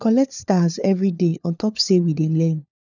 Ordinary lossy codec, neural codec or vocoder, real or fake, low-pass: none; codec, 16 kHz, 4 kbps, FunCodec, trained on LibriTTS, 50 frames a second; fake; 7.2 kHz